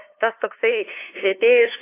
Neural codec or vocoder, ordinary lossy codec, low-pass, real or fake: codec, 16 kHz, 2 kbps, FunCodec, trained on LibriTTS, 25 frames a second; AAC, 16 kbps; 3.6 kHz; fake